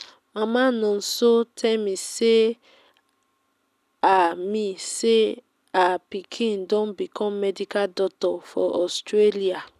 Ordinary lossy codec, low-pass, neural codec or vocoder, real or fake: none; 14.4 kHz; none; real